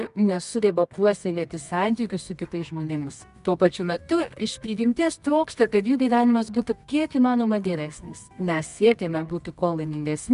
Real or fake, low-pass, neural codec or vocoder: fake; 10.8 kHz; codec, 24 kHz, 0.9 kbps, WavTokenizer, medium music audio release